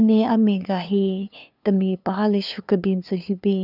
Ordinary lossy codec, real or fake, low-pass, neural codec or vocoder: none; fake; 5.4 kHz; codec, 16 kHz, 2 kbps, FunCodec, trained on LibriTTS, 25 frames a second